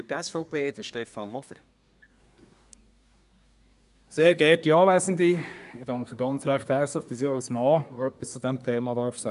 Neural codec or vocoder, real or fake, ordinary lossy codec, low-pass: codec, 24 kHz, 1 kbps, SNAC; fake; none; 10.8 kHz